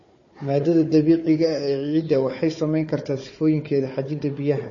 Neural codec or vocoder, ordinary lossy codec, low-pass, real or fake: codec, 16 kHz, 4 kbps, FunCodec, trained on Chinese and English, 50 frames a second; MP3, 32 kbps; 7.2 kHz; fake